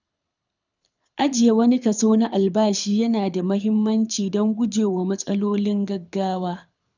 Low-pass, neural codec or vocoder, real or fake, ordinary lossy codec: 7.2 kHz; codec, 24 kHz, 6 kbps, HILCodec; fake; none